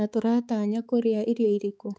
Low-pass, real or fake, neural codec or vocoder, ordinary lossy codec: none; fake; codec, 16 kHz, 4 kbps, X-Codec, HuBERT features, trained on balanced general audio; none